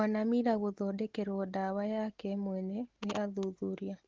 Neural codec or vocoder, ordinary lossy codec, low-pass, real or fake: none; Opus, 16 kbps; 7.2 kHz; real